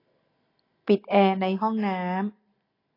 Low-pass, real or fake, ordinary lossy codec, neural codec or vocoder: 5.4 kHz; real; AAC, 24 kbps; none